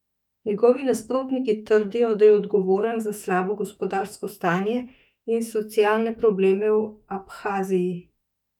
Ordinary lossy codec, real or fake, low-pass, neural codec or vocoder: none; fake; 19.8 kHz; autoencoder, 48 kHz, 32 numbers a frame, DAC-VAE, trained on Japanese speech